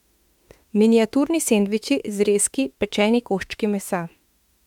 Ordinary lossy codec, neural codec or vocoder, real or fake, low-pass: MP3, 96 kbps; autoencoder, 48 kHz, 32 numbers a frame, DAC-VAE, trained on Japanese speech; fake; 19.8 kHz